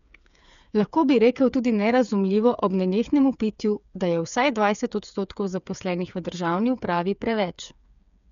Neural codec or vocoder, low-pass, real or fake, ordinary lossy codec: codec, 16 kHz, 8 kbps, FreqCodec, smaller model; 7.2 kHz; fake; none